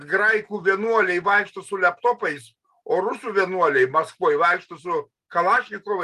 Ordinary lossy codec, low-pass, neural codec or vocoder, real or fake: Opus, 32 kbps; 14.4 kHz; none; real